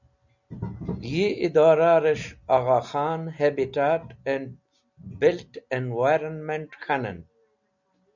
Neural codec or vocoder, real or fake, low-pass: none; real; 7.2 kHz